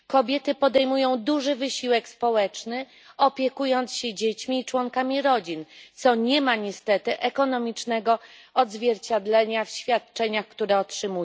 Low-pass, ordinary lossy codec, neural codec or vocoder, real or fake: none; none; none; real